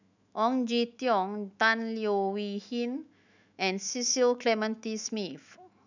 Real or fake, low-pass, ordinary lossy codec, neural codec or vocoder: fake; 7.2 kHz; none; autoencoder, 48 kHz, 128 numbers a frame, DAC-VAE, trained on Japanese speech